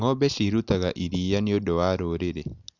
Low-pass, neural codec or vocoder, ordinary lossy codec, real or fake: 7.2 kHz; none; none; real